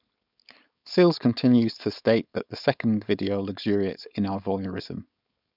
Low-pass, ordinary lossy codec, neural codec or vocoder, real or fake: 5.4 kHz; none; codec, 16 kHz, 4.8 kbps, FACodec; fake